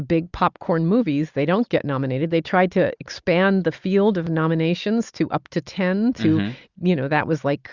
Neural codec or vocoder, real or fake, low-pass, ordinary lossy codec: none; real; 7.2 kHz; Opus, 64 kbps